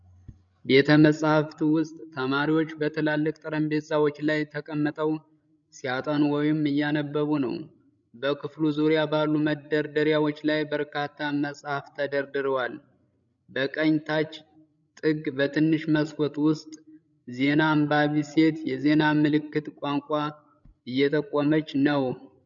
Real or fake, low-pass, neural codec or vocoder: fake; 7.2 kHz; codec, 16 kHz, 16 kbps, FreqCodec, larger model